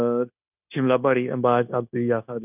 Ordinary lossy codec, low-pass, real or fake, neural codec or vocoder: none; 3.6 kHz; fake; codec, 16 kHz, 0.9 kbps, LongCat-Audio-Codec